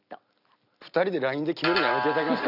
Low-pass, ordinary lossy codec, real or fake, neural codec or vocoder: 5.4 kHz; none; real; none